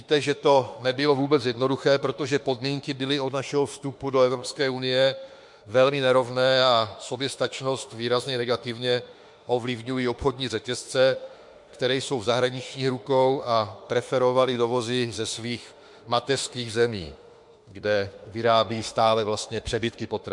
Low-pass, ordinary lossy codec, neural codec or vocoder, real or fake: 10.8 kHz; MP3, 64 kbps; autoencoder, 48 kHz, 32 numbers a frame, DAC-VAE, trained on Japanese speech; fake